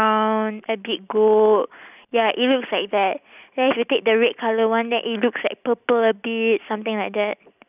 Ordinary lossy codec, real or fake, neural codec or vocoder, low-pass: none; real; none; 3.6 kHz